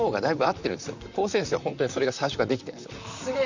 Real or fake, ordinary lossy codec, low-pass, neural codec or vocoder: fake; Opus, 64 kbps; 7.2 kHz; vocoder, 44.1 kHz, 128 mel bands, Pupu-Vocoder